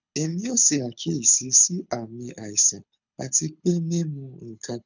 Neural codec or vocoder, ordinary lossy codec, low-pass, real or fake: codec, 24 kHz, 6 kbps, HILCodec; none; 7.2 kHz; fake